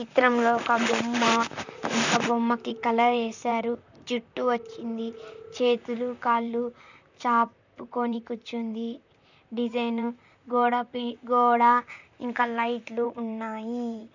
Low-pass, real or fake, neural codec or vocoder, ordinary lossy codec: 7.2 kHz; fake; vocoder, 44.1 kHz, 128 mel bands, Pupu-Vocoder; none